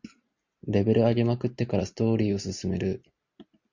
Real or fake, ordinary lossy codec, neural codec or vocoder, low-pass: real; Opus, 64 kbps; none; 7.2 kHz